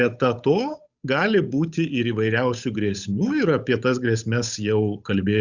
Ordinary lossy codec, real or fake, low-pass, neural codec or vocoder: Opus, 64 kbps; fake; 7.2 kHz; codec, 16 kHz, 8 kbps, FunCodec, trained on Chinese and English, 25 frames a second